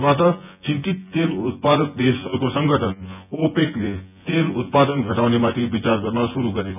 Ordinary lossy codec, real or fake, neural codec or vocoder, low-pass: none; fake; vocoder, 24 kHz, 100 mel bands, Vocos; 3.6 kHz